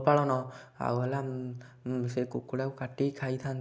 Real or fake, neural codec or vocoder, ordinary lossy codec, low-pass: real; none; none; none